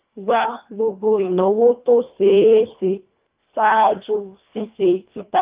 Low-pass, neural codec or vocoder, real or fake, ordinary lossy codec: 3.6 kHz; codec, 24 kHz, 1.5 kbps, HILCodec; fake; Opus, 24 kbps